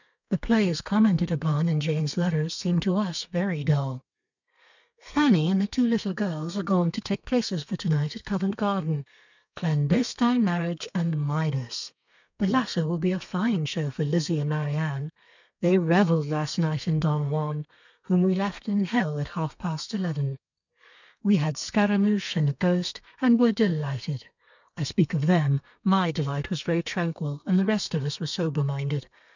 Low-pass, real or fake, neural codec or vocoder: 7.2 kHz; fake; codec, 32 kHz, 1.9 kbps, SNAC